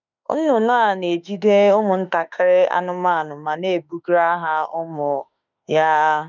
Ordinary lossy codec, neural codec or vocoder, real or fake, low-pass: none; autoencoder, 48 kHz, 32 numbers a frame, DAC-VAE, trained on Japanese speech; fake; 7.2 kHz